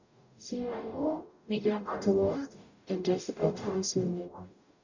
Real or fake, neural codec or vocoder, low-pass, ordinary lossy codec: fake; codec, 44.1 kHz, 0.9 kbps, DAC; 7.2 kHz; none